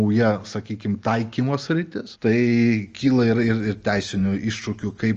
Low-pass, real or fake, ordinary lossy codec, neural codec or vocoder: 7.2 kHz; real; Opus, 24 kbps; none